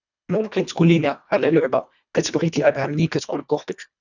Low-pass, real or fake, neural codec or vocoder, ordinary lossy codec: 7.2 kHz; fake; codec, 24 kHz, 1.5 kbps, HILCodec; none